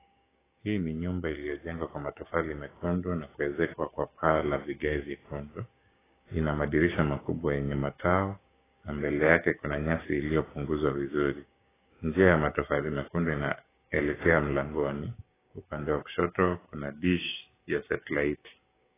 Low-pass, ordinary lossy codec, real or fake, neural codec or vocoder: 3.6 kHz; AAC, 16 kbps; fake; codec, 16 kHz, 6 kbps, DAC